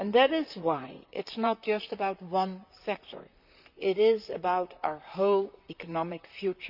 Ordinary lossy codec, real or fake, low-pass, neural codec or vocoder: none; fake; 5.4 kHz; vocoder, 44.1 kHz, 128 mel bands, Pupu-Vocoder